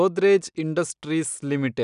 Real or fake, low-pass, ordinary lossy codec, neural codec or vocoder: real; 10.8 kHz; none; none